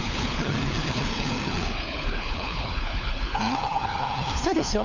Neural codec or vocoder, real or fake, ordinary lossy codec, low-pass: codec, 16 kHz, 4 kbps, FunCodec, trained on LibriTTS, 50 frames a second; fake; none; 7.2 kHz